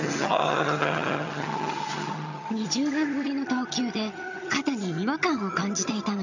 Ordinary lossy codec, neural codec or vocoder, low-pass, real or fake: none; vocoder, 22.05 kHz, 80 mel bands, HiFi-GAN; 7.2 kHz; fake